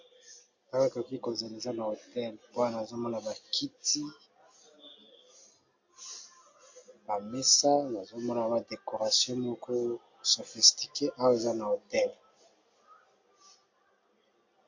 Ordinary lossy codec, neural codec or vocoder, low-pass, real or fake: MP3, 48 kbps; none; 7.2 kHz; real